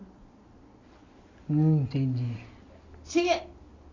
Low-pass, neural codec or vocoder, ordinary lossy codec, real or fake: 7.2 kHz; none; AAC, 32 kbps; real